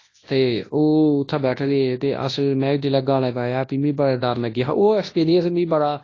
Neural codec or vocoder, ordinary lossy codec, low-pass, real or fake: codec, 24 kHz, 0.9 kbps, WavTokenizer, large speech release; AAC, 32 kbps; 7.2 kHz; fake